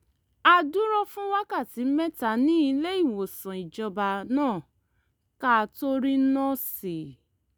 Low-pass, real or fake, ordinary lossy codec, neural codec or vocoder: none; real; none; none